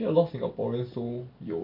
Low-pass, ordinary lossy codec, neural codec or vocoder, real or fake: 5.4 kHz; none; none; real